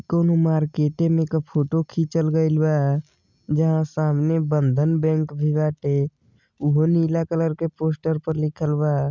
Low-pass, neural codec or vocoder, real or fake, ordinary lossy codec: 7.2 kHz; none; real; none